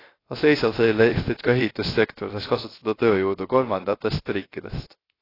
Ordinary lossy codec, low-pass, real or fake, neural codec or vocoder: AAC, 24 kbps; 5.4 kHz; fake; codec, 16 kHz, 0.3 kbps, FocalCodec